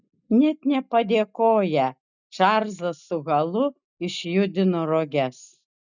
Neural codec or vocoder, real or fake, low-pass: none; real; 7.2 kHz